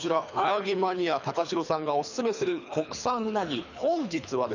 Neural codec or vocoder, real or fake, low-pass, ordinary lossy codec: codec, 24 kHz, 3 kbps, HILCodec; fake; 7.2 kHz; none